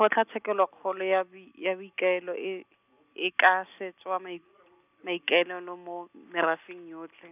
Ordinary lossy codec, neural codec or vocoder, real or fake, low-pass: none; none; real; 3.6 kHz